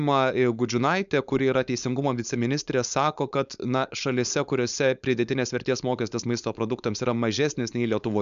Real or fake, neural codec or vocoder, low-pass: fake; codec, 16 kHz, 4.8 kbps, FACodec; 7.2 kHz